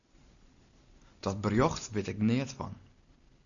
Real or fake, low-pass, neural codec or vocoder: real; 7.2 kHz; none